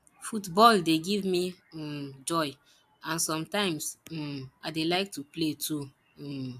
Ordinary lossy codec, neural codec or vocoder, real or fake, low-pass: AAC, 96 kbps; none; real; 14.4 kHz